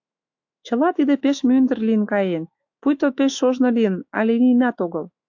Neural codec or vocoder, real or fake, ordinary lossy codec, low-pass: autoencoder, 48 kHz, 128 numbers a frame, DAC-VAE, trained on Japanese speech; fake; AAC, 48 kbps; 7.2 kHz